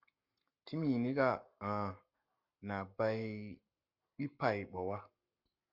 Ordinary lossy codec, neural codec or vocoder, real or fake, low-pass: MP3, 48 kbps; codec, 44.1 kHz, 7.8 kbps, Pupu-Codec; fake; 5.4 kHz